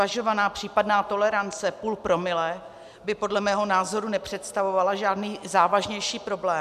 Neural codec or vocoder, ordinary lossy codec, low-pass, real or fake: none; MP3, 96 kbps; 14.4 kHz; real